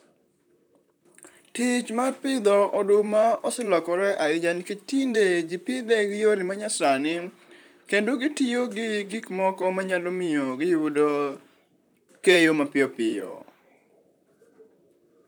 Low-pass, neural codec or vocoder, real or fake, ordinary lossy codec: none; vocoder, 44.1 kHz, 128 mel bands, Pupu-Vocoder; fake; none